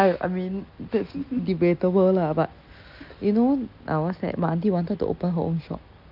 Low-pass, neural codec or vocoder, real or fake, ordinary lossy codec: 5.4 kHz; none; real; Opus, 24 kbps